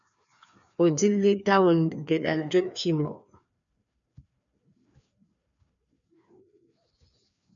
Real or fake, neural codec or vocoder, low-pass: fake; codec, 16 kHz, 2 kbps, FreqCodec, larger model; 7.2 kHz